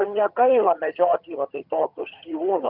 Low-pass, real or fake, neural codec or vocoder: 5.4 kHz; fake; vocoder, 22.05 kHz, 80 mel bands, HiFi-GAN